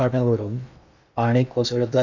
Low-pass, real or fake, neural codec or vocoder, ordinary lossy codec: 7.2 kHz; fake; codec, 16 kHz in and 24 kHz out, 0.6 kbps, FocalCodec, streaming, 2048 codes; none